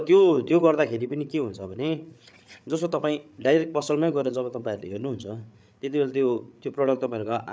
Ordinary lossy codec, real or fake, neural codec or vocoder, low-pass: none; fake; codec, 16 kHz, 8 kbps, FreqCodec, larger model; none